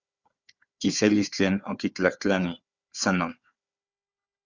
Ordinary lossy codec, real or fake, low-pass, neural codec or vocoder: Opus, 64 kbps; fake; 7.2 kHz; codec, 16 kHz, 4 kbps, FunCodec, trained on Chinese and English, 50 frames a second